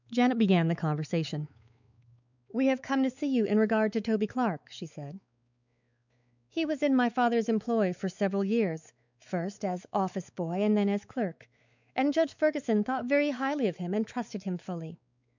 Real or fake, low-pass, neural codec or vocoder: fake; 7.2 kHz; codec, 16 kHz, 4 kbps, X-Codec, WavLM features, trained on Multilingual LibriSpeech